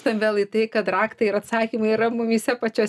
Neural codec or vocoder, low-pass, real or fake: none; 14.4 kHz; real